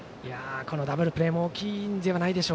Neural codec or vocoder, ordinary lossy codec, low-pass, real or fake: none; none; none; real